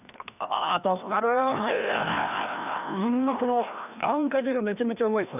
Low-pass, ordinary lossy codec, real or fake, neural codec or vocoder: 3.6 kHz; none; fake; codec, 16 kHz, 1 kbps, FreqCodec, larger model